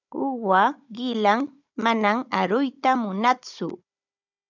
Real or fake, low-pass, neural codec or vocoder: fake; 7.2 kHz; codec, 16 kHz, 16 kbps, FunCodec, trained on Chinese and English, 50 frames a second